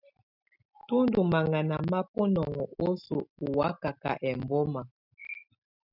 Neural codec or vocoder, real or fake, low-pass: none; real; 5.4 kHz